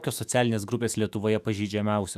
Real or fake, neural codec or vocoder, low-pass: fake; autoencoder, 48 kHz, 128 numbers a frame, DAC-VAE, trained on Japanese speech; 14.4 kHz